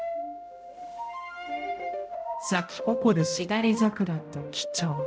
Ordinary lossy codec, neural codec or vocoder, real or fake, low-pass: none; codec, 16 kHz, 0.5 kbps, X-Codec, HuBERT features, trained on balanced general audio; fake; none